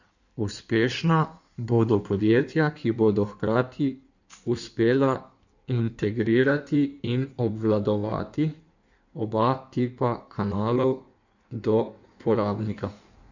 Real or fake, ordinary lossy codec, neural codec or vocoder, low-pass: fake; none; codec, 16 kHz in and 24 kHz out, 1.1 kbps, FireRedTTS-2 codec; 7.2 kHz